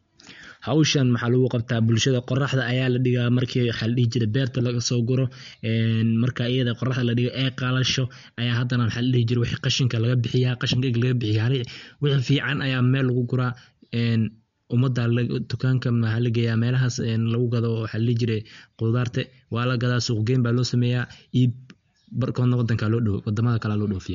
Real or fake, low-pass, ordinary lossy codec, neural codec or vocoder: real; 7.2 kHz; MP3, 48 kbps; none